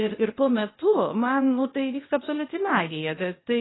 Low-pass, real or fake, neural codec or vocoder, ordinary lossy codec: 7.2 kHz; fake; codec, 16 kHz, 0.8 kbps, ZipCodec; AAC, 16 kbps